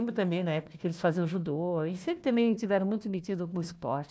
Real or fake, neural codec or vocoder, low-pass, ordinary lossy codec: fake; codec, 16 kHz, 1 kbps, FunCodec, trained on Chinese and English, 50 frames a second; none; none